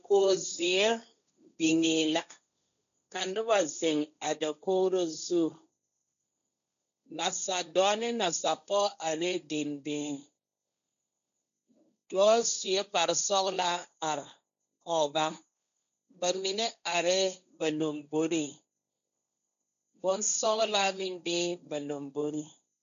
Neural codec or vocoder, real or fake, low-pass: codec, 16 kHz, 1.1 kbps, Voila-Tokenizer; fake; 7.2 kHz